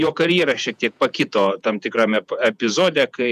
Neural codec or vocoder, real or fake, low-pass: vocoder, 48 kHz, 128 mel bands, Vocos; fake; 14.4 kHz